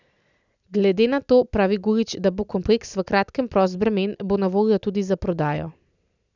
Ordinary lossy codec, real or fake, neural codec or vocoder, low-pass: none; real; none; 7.2 kHz